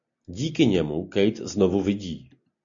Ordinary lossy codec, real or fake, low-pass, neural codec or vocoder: AAC, 64 kbps; real; 7.2 kHz; none